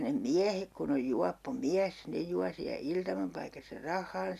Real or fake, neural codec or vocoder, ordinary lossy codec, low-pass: real; none; none; 14.4 kHz